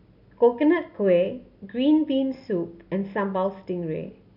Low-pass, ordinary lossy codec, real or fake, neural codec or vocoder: 5.4 kHz; none; real; none